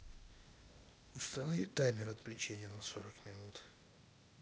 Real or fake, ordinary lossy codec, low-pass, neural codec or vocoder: fake; none; none; codec, 16 kHz, 0.8 kbps, ZipCodec